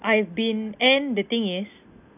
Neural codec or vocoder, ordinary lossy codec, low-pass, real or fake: none; none; 3.6 kHz; real